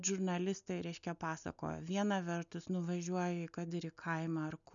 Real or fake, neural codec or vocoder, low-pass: real; none; 7.2 kHz